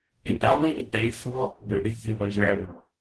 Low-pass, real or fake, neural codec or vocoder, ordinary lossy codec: 10.8 kHz; fake; codec, 44.1 kHz, 0.9 kbps, DAC; Opus, 16 kbps